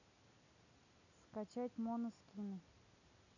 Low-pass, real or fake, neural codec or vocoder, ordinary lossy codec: 7.2 kHz; real; none; none